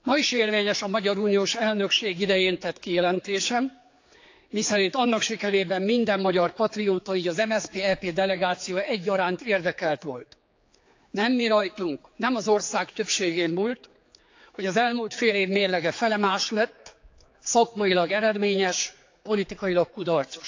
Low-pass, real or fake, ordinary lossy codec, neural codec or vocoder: 7.2 kHz; fake; AAC, 48 kbps; codec, 16 kHz, 4 kbps, X-Codec, HuBERT features, trained on general audio